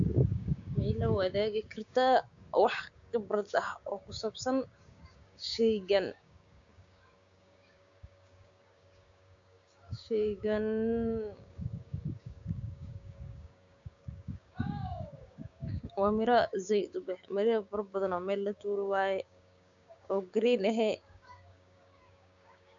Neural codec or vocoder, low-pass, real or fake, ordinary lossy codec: codec, 16 kHz, 6 kbps, DAC; 7.2 kHz; fake; AAC, 64 kbps